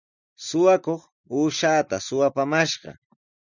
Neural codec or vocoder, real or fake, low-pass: none; real; 7.2 kHz